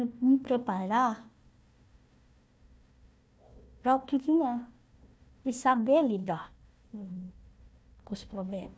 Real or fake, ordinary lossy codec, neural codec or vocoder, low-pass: fake; none; codec, 16 kHz, 1 kbps, FunCodec, trained on Chinese and English, 50 frames a second; none